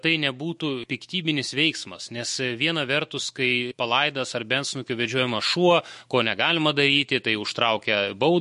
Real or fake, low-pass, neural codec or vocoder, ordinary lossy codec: fake; 14.4 kHz; autoencoder, 48 kHz, 128 numbers a frame, DAC-VAE, trained on Japanese speech; MP3, 48 kbps